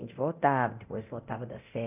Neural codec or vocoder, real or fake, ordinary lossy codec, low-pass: codec, 24 kHz, 0.9 kbps, DualCodec; fake; none; 3.6 kHz